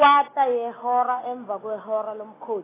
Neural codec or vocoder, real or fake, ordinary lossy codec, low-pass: none; real; AAC, 16 kbps; 3.6 kHz